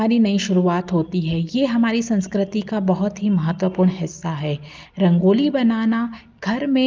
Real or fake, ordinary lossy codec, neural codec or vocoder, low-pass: real; Opus, 24 kbps; none; 7.2 kHz